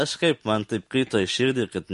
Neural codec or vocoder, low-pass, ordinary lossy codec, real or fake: none; 14.4 kHz; MP3, 48 kbps; real